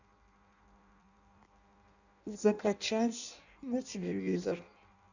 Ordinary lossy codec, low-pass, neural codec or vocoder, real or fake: none; 7.2 kHz; codec, 16 kHz in and 24 kHz out, 0.6 kbps, FireRedTTS-2 codec; fake